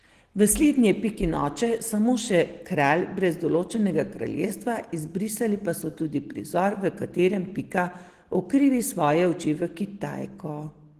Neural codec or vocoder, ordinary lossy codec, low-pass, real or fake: none; Opus, 16 kbps; 14.4 kHz; real